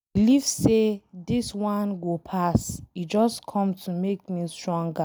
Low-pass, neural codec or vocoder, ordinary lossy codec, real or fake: none; none; none; real